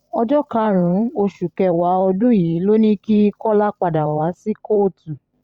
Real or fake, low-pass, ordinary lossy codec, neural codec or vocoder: fake; 19.8 kHz; Opus, 64 kbps; vocoder, 44.1 kHz, 128 mel bands every 512 samples, BigVGAN v2